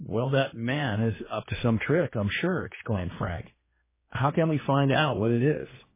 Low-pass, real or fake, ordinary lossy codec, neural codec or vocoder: 3.6 kHz; fake; MP3, 16 kbps; codec, 16 kHz, 2 kbps, X-Codec, HuBERT features, trained on general audio